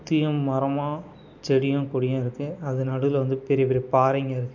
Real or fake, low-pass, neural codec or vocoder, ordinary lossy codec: real; 7.2 kHz; none; MP3, 64 kbps